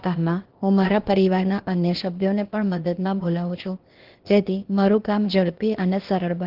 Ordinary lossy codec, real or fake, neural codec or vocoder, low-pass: Opus, 16 kbps; fake; codec, 16 kHz, 0.8 kbps, ZipCodec; 5.4 kHz